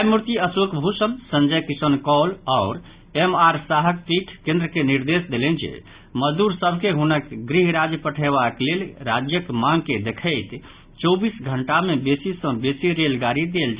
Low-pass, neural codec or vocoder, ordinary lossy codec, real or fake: 3.6 kHz; none; Opus, 64 kbps; real